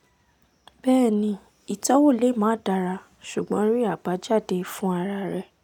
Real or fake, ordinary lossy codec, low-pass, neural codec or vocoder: real; none; 19.8 kHz; none